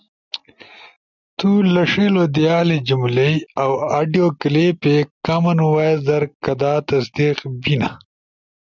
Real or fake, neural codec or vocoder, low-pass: real; none; 7.2 kHz